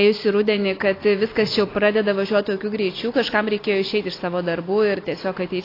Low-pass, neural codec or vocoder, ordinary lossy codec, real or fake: 5.4 kHz; none; AAC, 24 kbps; real